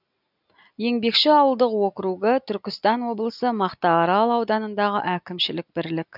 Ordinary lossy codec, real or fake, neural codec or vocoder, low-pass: none; real; none; 5.4 kHz